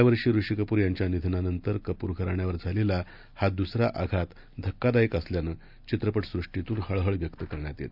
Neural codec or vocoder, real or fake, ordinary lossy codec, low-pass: none; real; none; 5.4 kHz